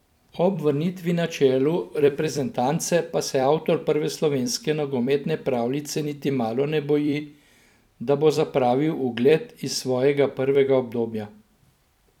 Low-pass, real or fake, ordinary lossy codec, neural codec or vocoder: 19.8 kHz; fake; none; vocoder, 44.1 kHz, 128 mel bands every 256 samples, BigVGAN v2